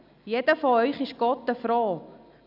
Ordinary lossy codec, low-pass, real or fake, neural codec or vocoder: none; 5.4 kHz; real; none